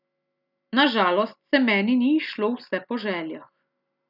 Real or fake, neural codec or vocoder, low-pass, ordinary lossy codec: real; none; 5.4 kHz; none